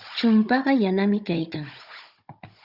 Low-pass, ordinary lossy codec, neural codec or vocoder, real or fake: 5.4 kHz; Opus, 64 kbps; codec, 16 kHz, 16 kbps, FunCodec, trained on Chinese and English, 50 frames a second; fake